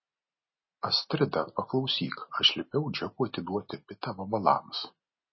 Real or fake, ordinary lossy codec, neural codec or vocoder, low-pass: real; MP3, 24 kbps; none; 7.2 kHz